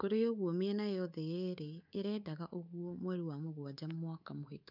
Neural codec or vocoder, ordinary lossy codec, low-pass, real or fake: codec, 24 kHz, 3.1 kbps, DualCodec; none; 5.4 kHz; fake